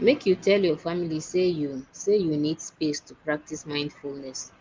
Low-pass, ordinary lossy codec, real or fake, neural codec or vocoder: 7.2 kHz; Opus, 24 kbps; real; none